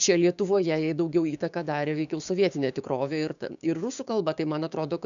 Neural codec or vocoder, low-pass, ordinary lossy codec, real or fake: codec, 16 kHz, 6 kbps, DAC; 7.2 kHz; MP3, 96 kbps; fake